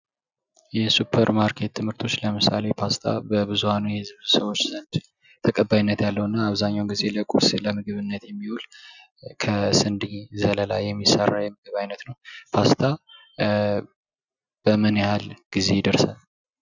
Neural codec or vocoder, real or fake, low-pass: none; real; 7.2 kHz